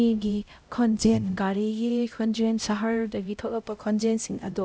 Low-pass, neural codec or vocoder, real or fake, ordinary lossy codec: none; codec, 16 kHz, 0.5 kbps, X-Codec, HuBERT features, trained on LibriSpeech; fake; none